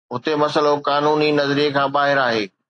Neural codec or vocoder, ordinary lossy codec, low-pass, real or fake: none; AAC, 48 kbps; 9.9 kHz; real